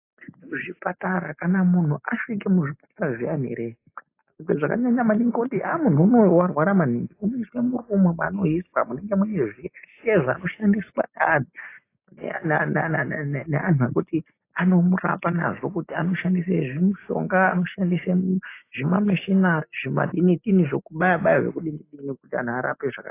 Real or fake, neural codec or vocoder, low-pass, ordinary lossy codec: real; none; 3.6 kHz; AAC, 24 kbps